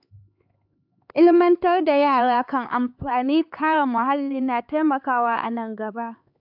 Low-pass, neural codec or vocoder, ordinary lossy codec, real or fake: 5.4 kHz; codec, 16 kHz, 4 kbps, X-Codec, HuBERT features, trained on LibriSpeech; AAC, 48 kbps; fake